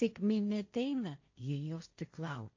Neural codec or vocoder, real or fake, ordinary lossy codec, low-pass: codec, 16 kHz, 1.1 kbps, Voila-Tokenizer; fake; AAC, 48 kbps; 7.2 kHz